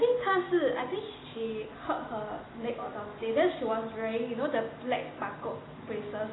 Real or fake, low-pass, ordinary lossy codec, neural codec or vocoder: real; 7.2 kHz; AAC, 16 kbps; none